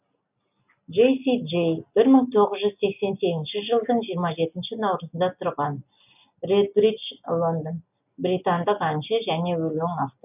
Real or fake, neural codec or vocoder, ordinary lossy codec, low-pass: real; none; none; 3.6 kHz